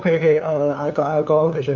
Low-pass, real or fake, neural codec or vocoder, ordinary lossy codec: 7.2 kHz; fake; codec, 16 kHz, 2 kbps, FunCodec, trained on LibriTTS, 25 frames a second; none